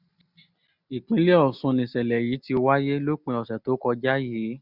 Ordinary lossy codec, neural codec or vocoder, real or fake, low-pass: none; none; real; 5.4 kHz